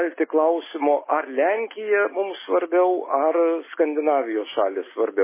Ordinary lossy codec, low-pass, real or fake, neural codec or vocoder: MP3, 16 kbps; 3.6 kHz; real; none